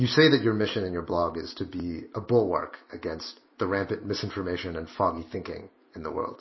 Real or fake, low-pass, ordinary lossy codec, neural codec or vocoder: real; 7.2 kHz; MP3, 24 kbps; none